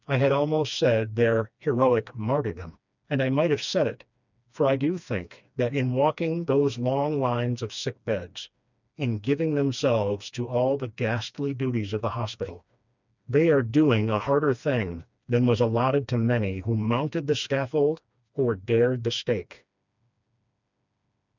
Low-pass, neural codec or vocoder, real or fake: 7.2 kHz; codec, 16 kHz, 2 kbps, FreqCodec, smaller model; fake